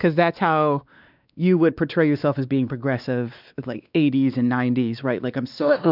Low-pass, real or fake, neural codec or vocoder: 5.4 kHz; fake; codec, 16 kHz, 2 kbps, X-Codec, WavLM features, trained on Multilingual LibriSpeech